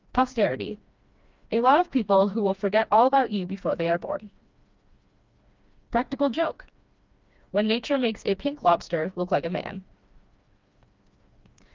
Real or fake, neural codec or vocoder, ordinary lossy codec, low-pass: fake; codec, 16 kHz, 2 kbps, FreqCodec, smaller model; Opus, 24 kbps; 7.2 kHz